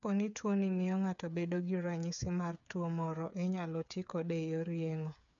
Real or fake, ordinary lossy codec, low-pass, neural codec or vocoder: fake; none; 7.2 kHz; codec, 16 kHz, 8 kbps, FreqCodec, smaller model